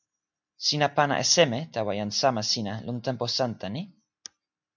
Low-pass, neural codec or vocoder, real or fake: 7.2 kHz; none; real